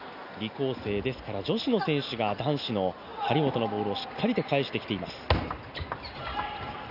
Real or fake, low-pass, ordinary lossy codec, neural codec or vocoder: real; 5.4 kHz; none; none